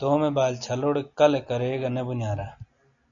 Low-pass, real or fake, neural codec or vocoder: 7.2 kHz; real; none